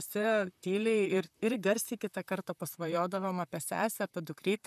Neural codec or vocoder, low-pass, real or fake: vocoder, 44.1 kHz, 128 mel bands, Pupu-Vocoder; 14.4 kHz; fake